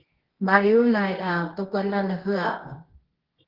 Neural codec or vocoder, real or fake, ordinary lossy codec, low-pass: codec, 24 kHz, 0.9 kbps, WavTokenizer, medium music audio release; fake; Opus, 16 kbps; 5.4 kHz